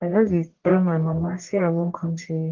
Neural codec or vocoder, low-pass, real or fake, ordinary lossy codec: codec, 44.1 kHz, 1.7 kbps, Pupu-Codec; 7.2 kHz; fake; Opus, 16 kbps